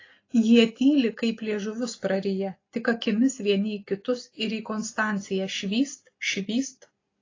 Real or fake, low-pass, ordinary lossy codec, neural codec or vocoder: real; 7.2 kHz; AAC, 32 kbps; none